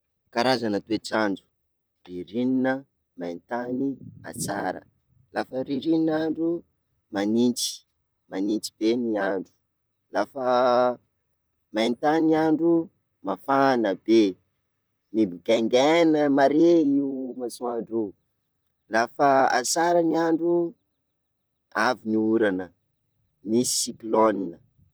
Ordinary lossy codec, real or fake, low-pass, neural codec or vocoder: none; fake; none; vocoder, 44.1 kHz, 128 mel bands, Pupu-Vocoder